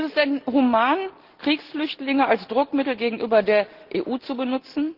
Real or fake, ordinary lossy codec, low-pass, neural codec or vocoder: real; Opus, 16 kbps; 5.4 kHz; none